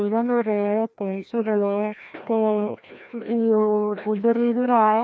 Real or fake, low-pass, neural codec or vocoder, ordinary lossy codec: fake; none; codec, 16 kHz, 1 kbps, FreqCodec, larger model; none